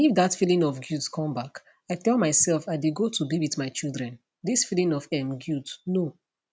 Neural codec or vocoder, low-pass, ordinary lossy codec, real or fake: none; none; none; real